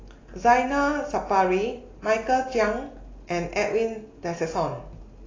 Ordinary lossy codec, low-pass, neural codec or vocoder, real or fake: AAC, 32 kbps; 7.2 kHz; none; real